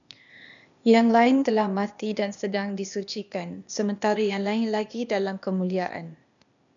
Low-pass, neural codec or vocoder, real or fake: 7.2 kHz; codec, 16 kHz, 0.8 kbps, ZipCodec; fake